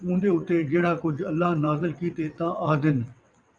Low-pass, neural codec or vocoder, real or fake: 9.9 kHz; vocoder, 22.05 kHz, 80 mel bands, WaveNeXt; fake